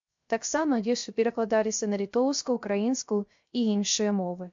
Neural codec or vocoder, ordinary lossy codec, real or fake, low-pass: codec, 16 kHz, 0.3 kbps, FocalCodec; MP3, 48 kbps; fake; 7.2 kHz